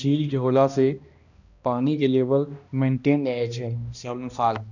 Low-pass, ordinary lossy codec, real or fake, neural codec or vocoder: 7.2 kHz; none; fake; codec, 16 kHz, 1 kbps, X-Codec, HuBERT features, trained on balanced general audio